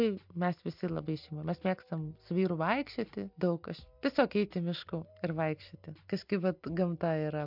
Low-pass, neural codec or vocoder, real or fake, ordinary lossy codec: 5.4 kHz; none; real; MP3, 48 kbps